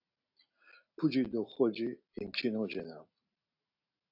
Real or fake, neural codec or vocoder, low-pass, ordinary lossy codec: real; none; 5.4 kHz; AAC, 48 kbps